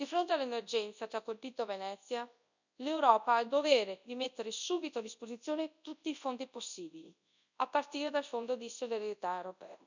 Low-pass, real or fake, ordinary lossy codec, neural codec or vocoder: 7.2 kHz; fake; none; codec, 24 kHz, 0.9 kbps, WavTokenizer, large speech release